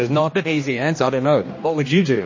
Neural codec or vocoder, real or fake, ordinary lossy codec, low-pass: codec, 16 kHz, 1 kbps, X-Codec, HuBERT features, trained on general audio; fake; MP3, 32 kbps; 7.2 kHz